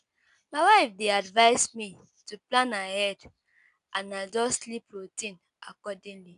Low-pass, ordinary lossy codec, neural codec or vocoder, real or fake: 10.8 kHz; none; none; real